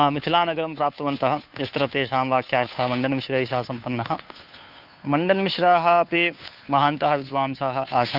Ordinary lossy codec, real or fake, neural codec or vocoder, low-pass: none; fake; codec, 16 kHz, 2 kbps, FunCodec, trained on Chinese and English, 25 frames a second; 5.4 kHz